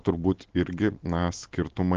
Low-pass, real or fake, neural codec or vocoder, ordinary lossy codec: 7.2 kHz; real; none; Opus, 16 kbps